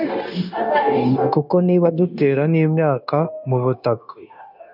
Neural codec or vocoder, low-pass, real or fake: codec, 16 kHz, 0.9 kbps, LongCat-Audio-Codec; 5.4 kHz; fake